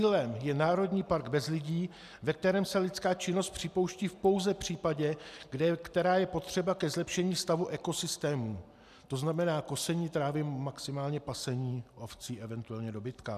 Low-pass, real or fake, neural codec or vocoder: 14.4 kHz; real; none